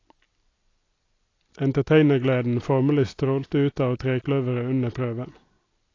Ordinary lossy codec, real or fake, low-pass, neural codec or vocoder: AAC, 32 kbps; real; 7.2 kHz; none